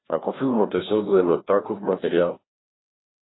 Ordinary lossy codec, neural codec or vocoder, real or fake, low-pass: AAC, 16 kbps; codec, 16 kHz, 1 kbps, FreqCodec, larger model; fake; 7.2 kHz